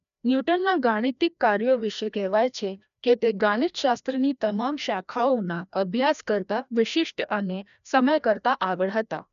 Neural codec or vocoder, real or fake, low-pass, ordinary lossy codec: codec, 16 kHz, 1 kbps, FreqCodec, larger model; fake; 7.2 kHz; none